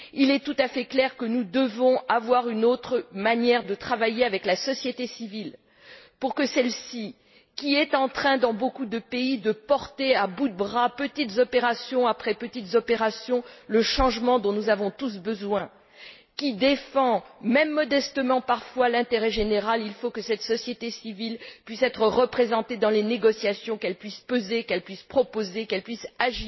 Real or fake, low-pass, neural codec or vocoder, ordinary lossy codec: real; 7.2 kHz; none; MP3, 24 kbps